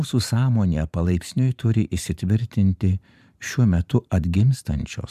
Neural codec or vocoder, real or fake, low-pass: vocoder, 44.1 kHz, 128 mel bands every 512 samples, BigVGAN v2; fake; 14.4 kHz